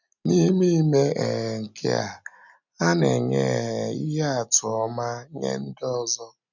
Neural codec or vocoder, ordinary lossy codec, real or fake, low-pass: none; none; real; 7.2 kHz